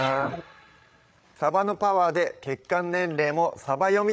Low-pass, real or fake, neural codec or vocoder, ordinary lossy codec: none; fake; codec, 16 kHz, 8 kbps, FreqCodec, larger model; none